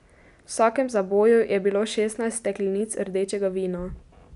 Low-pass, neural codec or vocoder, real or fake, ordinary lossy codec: 10.8 kHz; none; real; none